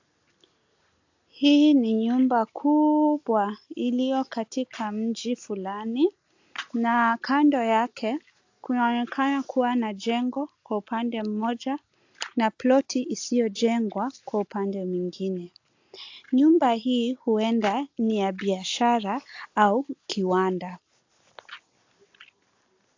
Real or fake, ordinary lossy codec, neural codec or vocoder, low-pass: real; AAC, 48 kbps; none; 7.2 kHz